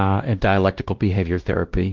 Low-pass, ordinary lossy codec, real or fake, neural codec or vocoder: 7.2 kHz; Opus, 16 kbps; fake; codec, 16 kHz, 1 kbps, X-Codec, WavLM features, trained on Multilingual LibriSpeech